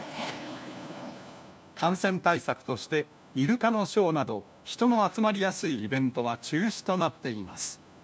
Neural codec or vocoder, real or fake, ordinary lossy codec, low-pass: codec, 16 kHz, 1 kbps, FunCodec, trained on LibriTTS, 50 frames a second; fake; none; none